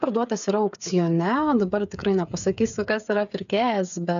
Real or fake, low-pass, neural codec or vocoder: fake; 7.2 kHz; codec, 16 kHz, 8 kbps, FreqCodec, smaller model